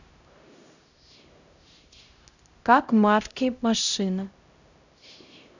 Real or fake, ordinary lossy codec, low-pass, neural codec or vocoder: fake; none; 7.2 kHz; codec, 16 kHz, 0.5 kbps, X-Codec, HuBERT features, trained on LibriSpeech